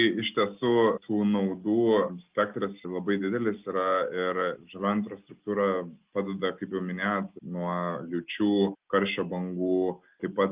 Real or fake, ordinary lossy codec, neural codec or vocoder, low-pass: real; Opus, 32 kbps; none; 3.6 kHz